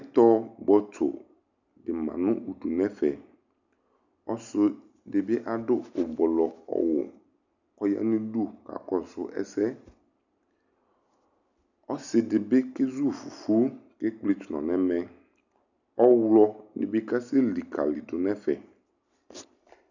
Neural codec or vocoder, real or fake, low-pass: none; real; 7.2 kHz